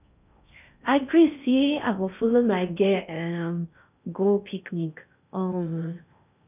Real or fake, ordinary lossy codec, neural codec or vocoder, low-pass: fake; none; codec, 16 kHz in and 24 kHz out, 0.6 kbps, FocalCodec, streaming, 4096 codes; 3.6 kHz